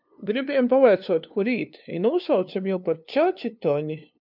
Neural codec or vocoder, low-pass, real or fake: codec, 16 kHz, 2 kbps, FunCodec, trained on LibriTTS, 25 frames a second; 5.4 kHz; fake